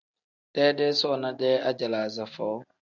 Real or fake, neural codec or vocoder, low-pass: real; none; 7.2 kHz